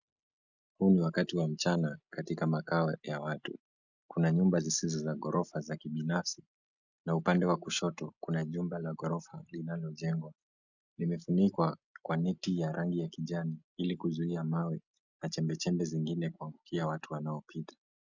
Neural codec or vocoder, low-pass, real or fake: none; 7.2 kHz; real